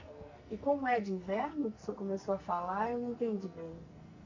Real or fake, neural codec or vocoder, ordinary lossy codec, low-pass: fake; codec, 44.1 kHz, 2.6 kbps, SNAC; Opus, 64 kbps; 7.2 kHz